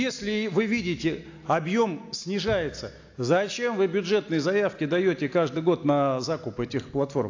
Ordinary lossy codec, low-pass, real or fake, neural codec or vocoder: AAC, 48 kbps; 7.2 kHz; real; none